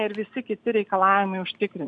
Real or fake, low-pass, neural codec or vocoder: real; 9.9 kHz; none